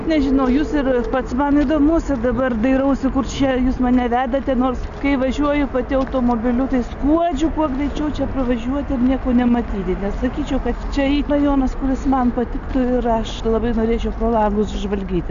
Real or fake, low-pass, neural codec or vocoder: real; 7.2 kHz; none